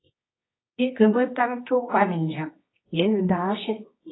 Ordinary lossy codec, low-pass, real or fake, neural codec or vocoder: AAC, 16 kbps; 7.2 kHz; fake; codec, 24 kHz, 0.9 kbps, WavTokenizer, medium music audio release